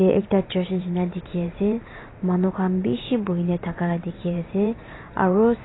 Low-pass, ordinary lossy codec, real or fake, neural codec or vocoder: 7.2 kHz; AAC, 16 kbps; fake; vocoder, 44.1 kHz, 128 mel bands every 512 samples, BigVGAN v2